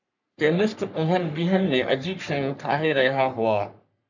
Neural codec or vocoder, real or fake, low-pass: codec, 44.1 kHz, 3.4 kbps, Pupu-Codec; fake; 7.2 kHz